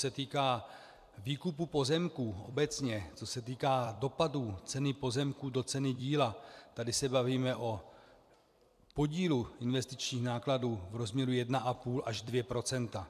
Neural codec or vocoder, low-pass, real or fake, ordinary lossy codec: vocoder, 44.1 kHz, 128 mel bands every 256 samples, BigVGAN v2; 14.4 kHz; fake; AAC, 96 kbps